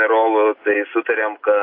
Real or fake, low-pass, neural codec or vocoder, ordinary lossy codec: real; 5.4 kHz; none; AAC, 32 kbps